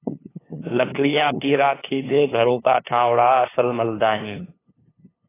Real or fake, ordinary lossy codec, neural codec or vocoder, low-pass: fake; AAC, 16 kbps; codec, 16 kHz, 2 kbps, FunCodec, trained on LibriTTS, 25 frames a second; 3.6 kHz